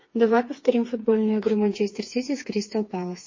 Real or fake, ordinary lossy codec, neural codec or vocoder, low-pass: fake; MP3, 32 kbps; codec, 16 kHz, 4 kbps, FreqCodec, smaller model; 7.2 kHz